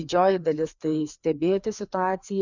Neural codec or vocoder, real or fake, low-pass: codec, 16 kHz, 2 kbps, FreqCodec, larger model; fake; 7.2 kHz